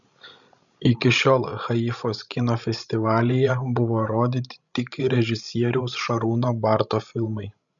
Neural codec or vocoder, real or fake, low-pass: codec, 16 kHz, 16 kbps, FreqCodec, larger model; fake; 7.2 kHz